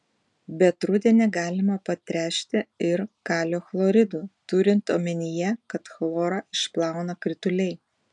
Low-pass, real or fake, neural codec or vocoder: 10.8 kHz; real; none